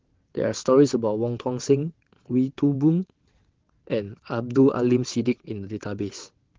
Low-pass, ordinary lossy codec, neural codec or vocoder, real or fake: 7.2 kHz; Opus, 16 kbps; vocoder, 22.05 kHz, 80 mel bands, WaveNeXt; fake